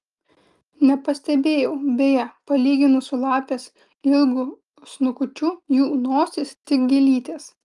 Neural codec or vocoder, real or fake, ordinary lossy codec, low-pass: none; real; Opus, 32 kbps; 10.8 kHz